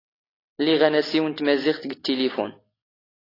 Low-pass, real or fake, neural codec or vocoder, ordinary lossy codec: 5.4 kHz; real; none; AAC, 24 kbps